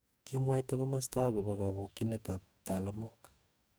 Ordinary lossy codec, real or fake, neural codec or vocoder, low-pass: none; fake; codec, 44.1 kHz, 2.6 kbps, DAC; none